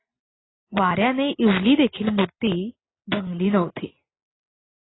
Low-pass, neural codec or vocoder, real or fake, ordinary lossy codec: 7.2 kHz; none; real; AAC, 16 kbps